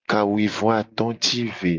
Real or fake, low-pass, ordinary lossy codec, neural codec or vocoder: real; 7.2 kHz; Opus, 24 kbps; none